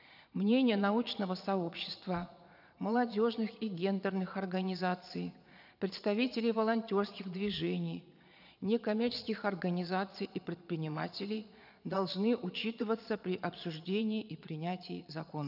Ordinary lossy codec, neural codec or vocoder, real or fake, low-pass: none; vocoder, 22.05 kHz, 80 mel bands, Vocos; fake; 5.4 kHz